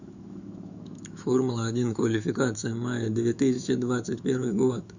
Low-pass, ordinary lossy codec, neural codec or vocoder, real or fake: 7.2 kHz; Opus, 64 kbps; autoencoder, 48 kHz, 128 numbers a frame, DAC-VAE, trained on Japanese speech; fake